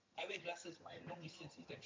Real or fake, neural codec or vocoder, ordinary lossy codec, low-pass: fake; vocoder, 22.05 kHz, 80 mel bands, HiFi-GAN; MP3, 48 kbps; 7.2 kHz